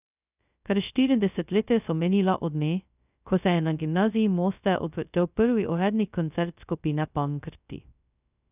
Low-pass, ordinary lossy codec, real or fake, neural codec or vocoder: 3.6 kHz; none; fake; codec, 16 kHz, 0.2 kbps, FocalCodec